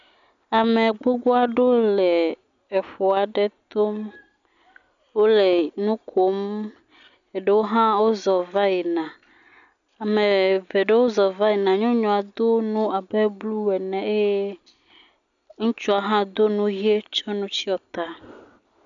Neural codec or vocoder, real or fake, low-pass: none; real; 7.2 kHz